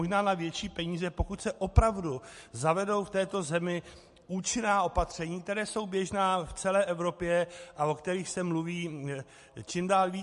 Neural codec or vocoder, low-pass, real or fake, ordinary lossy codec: none; 14.4 kHz; real; MP3, 48 kbps